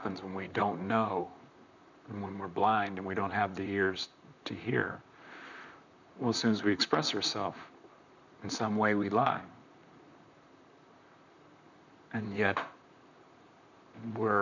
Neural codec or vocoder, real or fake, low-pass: vocoder, 44.1 kHz, 128 mel bands, Pupu-Vocoder; fake; 7.2 kHz